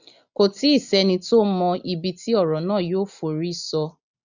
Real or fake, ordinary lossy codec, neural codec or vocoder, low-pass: real; none; none; 7.2 kHz